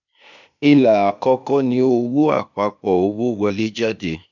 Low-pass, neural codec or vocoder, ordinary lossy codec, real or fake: 7.2 kHz; codec, 16 kHz, 0.8 kbps, ZipCodec; none; fake